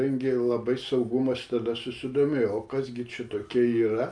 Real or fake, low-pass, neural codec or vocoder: real; 9.9 kHz; none